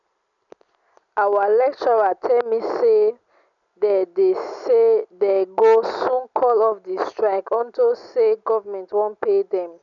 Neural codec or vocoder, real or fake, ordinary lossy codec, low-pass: none; real; none; 7.2 kHz